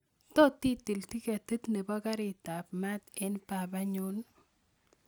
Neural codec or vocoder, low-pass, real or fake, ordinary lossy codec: none; none; real; none